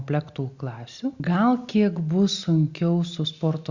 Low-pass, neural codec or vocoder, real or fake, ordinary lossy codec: 7.2 kHz; none; real; Opus, 64 kbps